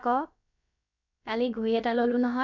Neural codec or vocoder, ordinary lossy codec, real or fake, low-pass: codec, 16 kHz, about 1 kbps, DyCAST, with the encoder's durations; none; fake; 7.2 kHz